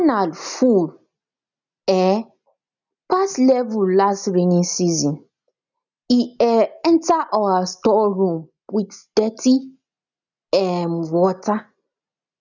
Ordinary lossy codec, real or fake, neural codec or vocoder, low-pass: none; real; none; 7.2 kHz